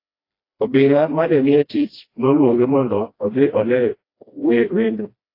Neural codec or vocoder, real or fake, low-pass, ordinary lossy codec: codec, 16 kHz, 1 kbps, FreqCodec, smaller model; fake; 5.4 kHz; AAC, 32 kbps